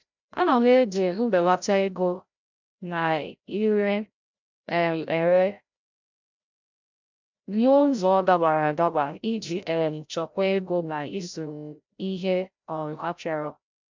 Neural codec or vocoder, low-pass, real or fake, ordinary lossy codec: codec, 16 kHz, 0.5 kbps, FreqCodec, larger model; 7.2 kHz; fake; MP3, 64 kbps